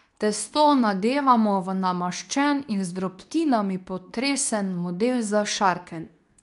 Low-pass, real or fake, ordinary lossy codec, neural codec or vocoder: 10.8 kHz; fake; none; codec, 24 kHz, 0.9 kbps, WavTokenizer, medium speech release version 2